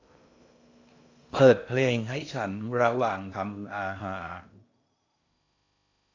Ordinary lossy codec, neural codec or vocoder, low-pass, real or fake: AAC, 48 kbps; codec, 16 kHz in and 24 kHz out, 0.8 kbps, FocalCodec, streaming, 65536 codes; 7.2 kHz; fake